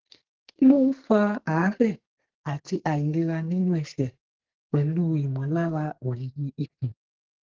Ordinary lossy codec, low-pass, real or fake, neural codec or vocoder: Opus, 16 kbps; 7.2 kHz; fake; codec, 44.1 kHz, 2.6 kbps, SNAC